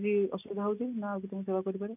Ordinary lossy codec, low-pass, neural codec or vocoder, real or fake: none; 3.6 kHz; none; real